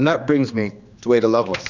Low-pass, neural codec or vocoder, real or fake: 7.2 kHz; codec, 16 kHz, 2 kbps, X-Codec, HuBERT features, trained on general audio; fake